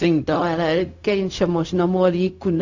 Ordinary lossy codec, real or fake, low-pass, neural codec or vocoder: AAC, 48 kbps; fake; 7.2 kHz; codec, 16 kHz, 0.4 kbps, LongCat-Audio-Codec